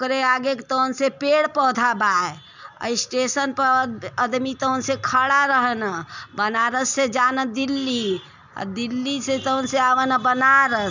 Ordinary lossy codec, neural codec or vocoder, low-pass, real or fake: none; none; 7.2 kHz; real